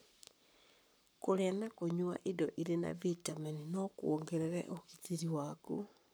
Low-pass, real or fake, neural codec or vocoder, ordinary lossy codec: none; fake; codec, 44.1 kHz, 7.8 kbps, Pupu-Codec; none